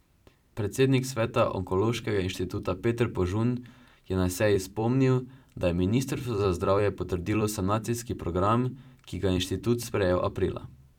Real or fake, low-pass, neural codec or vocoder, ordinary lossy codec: fake; 19.8 kHz; vocoder, 44.1 kHz, 128 mel bands every 256 samples, BigVGAN v2; none